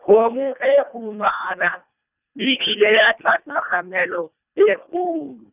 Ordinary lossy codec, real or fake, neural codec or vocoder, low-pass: none; fake; codec, 24 kHz, 1.5 kbps, HILCodec; 3.6 kHz